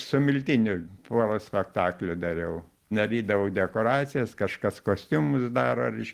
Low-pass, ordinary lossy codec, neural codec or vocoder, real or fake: 14.4 kHz; Opus, 24 kbps; none; real